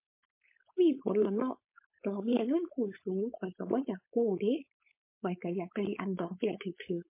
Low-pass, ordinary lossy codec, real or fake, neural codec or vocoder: 3.6 kHz; MP3, 24 kbps; fake; codec, 16 kHz, 4.8 kbps, FACodec